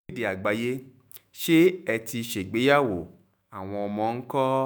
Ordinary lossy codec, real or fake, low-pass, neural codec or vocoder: none; fake; none; autoencoder, 48 kHz, 128 numbers a frame, DAC-VAE, trained on Japanese speech